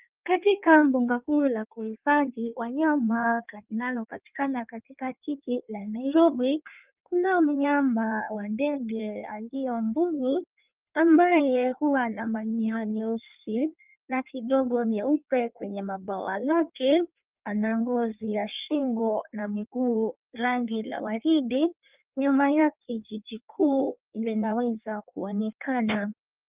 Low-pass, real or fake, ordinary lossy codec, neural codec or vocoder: 3.6 kHz; fake; Opus, 24 kbps; codec, 16 kHz in and 24 kHz out, 1.1 kbps, FireRedTTS-2 codec